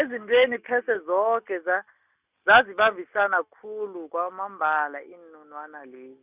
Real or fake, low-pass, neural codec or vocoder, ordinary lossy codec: real; 3.6 kHz; none; Opus, 64 kbps